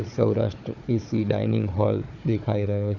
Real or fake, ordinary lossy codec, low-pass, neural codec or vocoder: fake; none; 7.2 kHz; codec, 16 kHz, 4 kbps, FunCodec, trained on Chinese and English, 50 frames a second